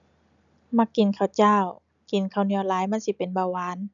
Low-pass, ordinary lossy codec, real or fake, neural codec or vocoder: 7.2 kHz; none; real; none